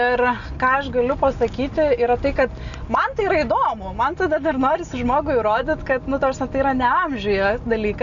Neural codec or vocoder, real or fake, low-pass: none; real; 7.2 kHz